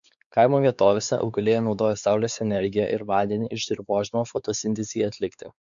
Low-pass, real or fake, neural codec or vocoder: 7.2 kHz; fake; codec, 16 kHz, 4 kbps, X-Codec, WavLM features, trained on Multilingual LibriSpeech